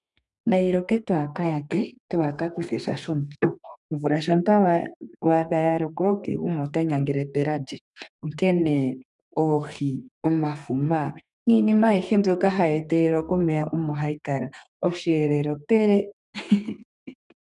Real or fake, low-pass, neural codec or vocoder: fake; 10.8 kHz; codec, 32 kHz, 1.9 kbps, SNAC